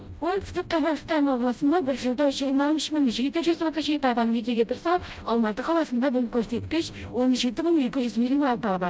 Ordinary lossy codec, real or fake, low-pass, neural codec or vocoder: none; fake; none; codec, 16 kHz, 0.5 kbps, FreqCodec, smaller model